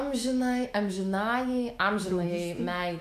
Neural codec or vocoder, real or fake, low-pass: autoencoder, 48 kHz, 128 numbers a frame, DAC-VAE, trained on Japanese speech; fake; 14.4 kHz